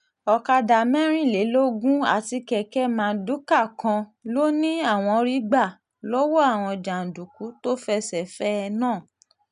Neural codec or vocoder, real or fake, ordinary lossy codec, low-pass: none; real; none; 10.8 kHz